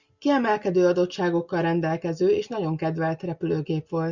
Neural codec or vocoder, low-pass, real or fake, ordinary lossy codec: none; 7.2 kHz; real; Opus, 64 kbps